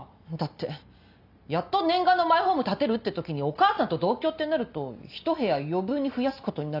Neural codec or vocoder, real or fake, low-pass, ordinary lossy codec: none; real; 5.4 kHz; none